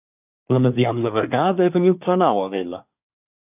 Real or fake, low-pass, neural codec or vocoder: fake; 3.6 kHz; codec, 24 kHz, 1 kbps, SNAC